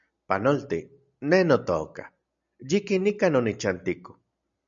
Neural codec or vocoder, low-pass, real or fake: none; 7.2 kHz; real